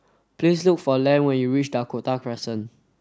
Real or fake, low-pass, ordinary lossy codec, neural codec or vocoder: real; none; none; none